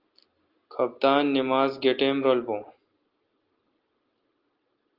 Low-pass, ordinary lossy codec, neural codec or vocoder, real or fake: 5.4 kHz; Opus, 32 kbps; none; real